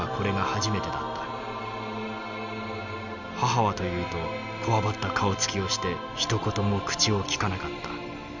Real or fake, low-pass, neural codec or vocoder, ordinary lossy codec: real; 7.2 kHz; none; none